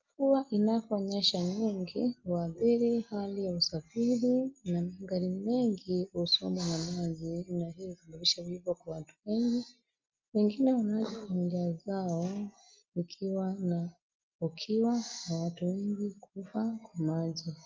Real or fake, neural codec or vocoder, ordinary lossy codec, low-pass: real; none; Opus, 32 kbps; 7.2 kHz